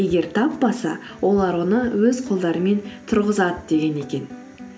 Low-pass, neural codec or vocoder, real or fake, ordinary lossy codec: none; none; real; none